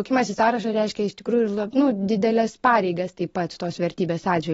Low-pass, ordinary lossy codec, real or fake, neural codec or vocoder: 19.8 kHz; AAC, 24 kbps; real; none